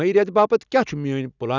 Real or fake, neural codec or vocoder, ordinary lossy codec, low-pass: real; none; none; 7.2 kHz